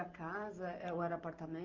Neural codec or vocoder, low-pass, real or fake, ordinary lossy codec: none; 7.2 kHz; real; Opus, 32 kbps